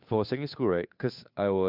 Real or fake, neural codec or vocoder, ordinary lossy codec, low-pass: fake; codec, 16 kHz, 2 kbps, FunCodec, trained on Chinese and English, 25 frames a second; MP3, 48 kbps; 5.4 kHz